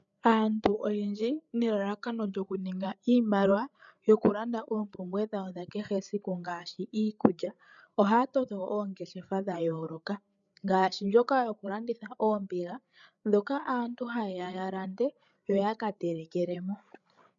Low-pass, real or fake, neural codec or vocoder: 7.2 kHz; fake; codec, 16 kHz, 8 kbps, FreqCodec, larger model